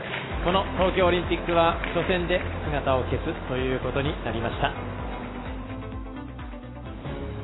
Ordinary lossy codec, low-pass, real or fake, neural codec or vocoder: AAC, 16 kbps; 7.2 kHz; real; none